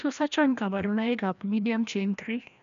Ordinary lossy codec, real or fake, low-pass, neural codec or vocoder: none; fake; 7.2 kHz; codec, 16 kHz, 1 kbps, FreqCodec, larger model